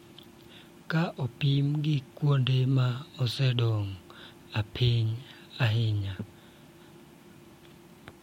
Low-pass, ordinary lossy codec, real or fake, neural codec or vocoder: 19.8 kHz; MP3, 64 kbps; real; none